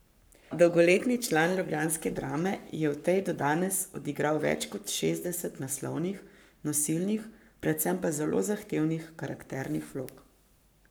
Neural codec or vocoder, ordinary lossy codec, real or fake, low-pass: codec, 44.1 kHz, 7.8 kbps, Pupu-Codec; none; fake; none